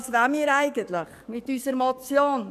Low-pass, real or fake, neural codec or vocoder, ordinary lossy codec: 14.4 kHz; fake; codec, 44.1 kHz, 7.8 kbps, DAC; none